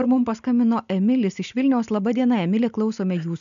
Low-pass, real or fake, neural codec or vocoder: 7.2 kHz; real; none